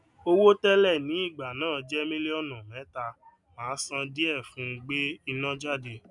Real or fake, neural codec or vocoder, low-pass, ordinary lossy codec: real; none; 10.8 kHz; none